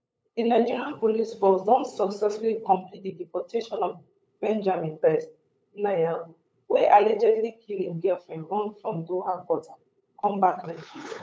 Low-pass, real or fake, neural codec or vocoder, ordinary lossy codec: none; fake; codec, 16 kHz, 8 kbps, FunCodec, trained on LibriTTS, 25 frames a second; none